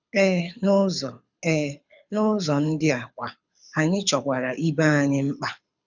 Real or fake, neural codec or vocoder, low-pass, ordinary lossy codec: fake; codec, 24 kHz, 6 kbps, HILCodec; 7.2 kHz; none